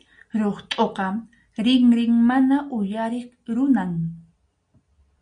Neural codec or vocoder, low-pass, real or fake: none; 9.9 kHz; real